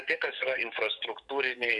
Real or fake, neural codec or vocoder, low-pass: fake; codec, 44.1 kHz, 7.8 kbps, DAC; 10.8 kHz